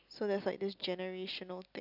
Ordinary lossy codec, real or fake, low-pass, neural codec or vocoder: none; real; 5.4 kHz; none